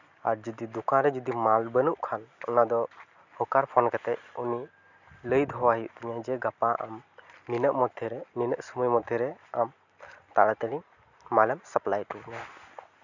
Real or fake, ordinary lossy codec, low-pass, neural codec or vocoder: real; none; 7.2 kHz; none